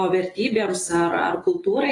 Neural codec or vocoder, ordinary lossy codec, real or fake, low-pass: none; AAC, 32 kbps; real; 10.8 kHz